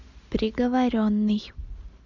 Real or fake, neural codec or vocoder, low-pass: real; none; 7.2 kHz